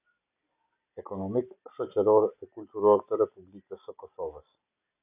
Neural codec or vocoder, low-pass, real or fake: none; 3.6 kHz; real